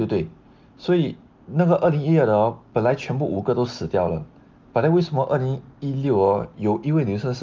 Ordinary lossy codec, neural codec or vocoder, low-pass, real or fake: Opus, 32 kbps; none; 7.2 kHz; real